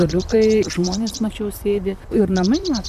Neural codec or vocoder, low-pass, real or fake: vocoder, 44.1 kHz, 128 mel bands, Pupu-Vocoder; 14.4 kHz; fake